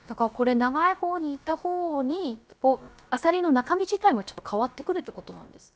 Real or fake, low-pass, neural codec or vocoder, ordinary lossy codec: fake; none; codec, 16 kHz, about 1 kbps, DyCAST, with the encoder's durations; none